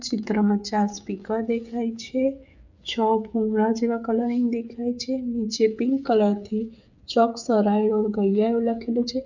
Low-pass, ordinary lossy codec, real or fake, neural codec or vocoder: 7.2 kHz; none; fake; codec, 16 kHz, 8 kbps, FreqCodec, smaller model